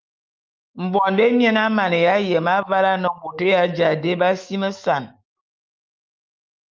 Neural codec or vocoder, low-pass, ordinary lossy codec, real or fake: autoencoder, 48 kHz, 128 numbers a frame, DAC-VAE, trained on Japanese speech; 7.2 kHz; Opus, 24 kbps; fake